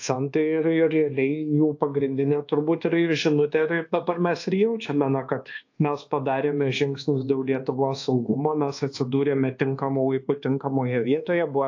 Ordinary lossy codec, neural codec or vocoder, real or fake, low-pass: AAC, 48 kbps; codec, 24 kHz, 1.2 kbps, DualCodec; fake; 7.2 kHz